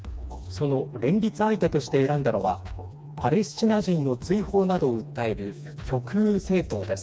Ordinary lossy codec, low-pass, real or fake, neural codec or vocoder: none; none; fake; codec, 16 kHz, 2 kbps, FreqCodec, smaller model